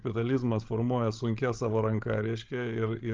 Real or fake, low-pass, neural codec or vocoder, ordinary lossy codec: fake; 7.2 kHz; codec, 16 kHz, 16 kbps, FreqCodec, larger model; Opus, 32 kbps